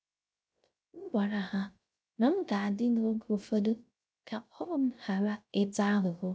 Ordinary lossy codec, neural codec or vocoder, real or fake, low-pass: none; codec, 16 kHz, 0.3 kbps, FocalCodec; fake; none